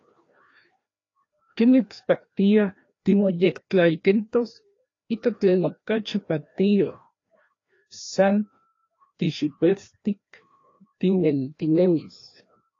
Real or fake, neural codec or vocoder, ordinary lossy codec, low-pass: fake; codec, 16 kHz, 1 kbps, FreqCodec, larger model; AAC, 32 kbps; 7.2 kHz